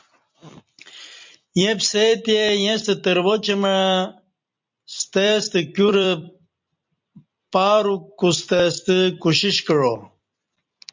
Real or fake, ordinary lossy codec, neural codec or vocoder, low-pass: fake; MP3, 64 kbps; vocoder, 44.1 kHz, 128 mel bands every 256 samples, BigVGAN v2; 7.2 kHz